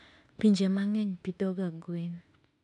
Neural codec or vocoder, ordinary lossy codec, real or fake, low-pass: autoencoder, 48 kHz, 32 numbers a frame, DAC-VAE, trained on Japanese speech; none; fake; 10.8 kHz